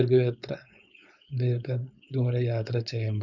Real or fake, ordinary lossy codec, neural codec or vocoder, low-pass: fake; none; codec, 16 kHz, 4.8 kbps, FACodec; 7.2 kHz